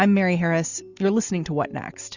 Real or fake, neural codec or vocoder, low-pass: real; none; 7.2 kHz